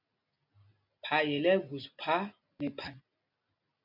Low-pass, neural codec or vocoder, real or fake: 5.4 kHz; none; real